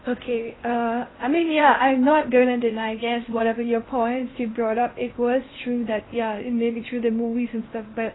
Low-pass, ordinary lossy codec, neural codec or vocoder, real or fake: 7.2 kHz; AAC, 16 kbps; codec, 16 kHz in and 24 kHz out, 0.8 kbps, FocalCodec, streaming, 65536 codes; fake